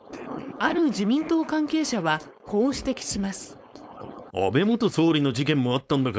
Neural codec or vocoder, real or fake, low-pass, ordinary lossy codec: codec, 16 kHz, 4.8 kbps, FACodec; fake; none; none